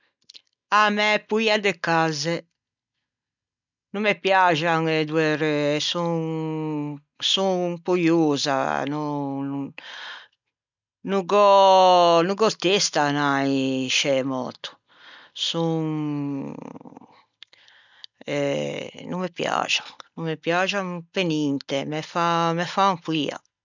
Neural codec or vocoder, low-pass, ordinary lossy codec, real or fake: none; 7.2 kHz; none; real